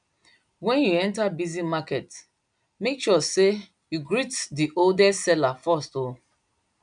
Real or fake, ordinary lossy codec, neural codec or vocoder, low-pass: real; none; none; 9.9 kHz